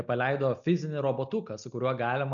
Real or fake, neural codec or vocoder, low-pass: real; none; 7.2 kHz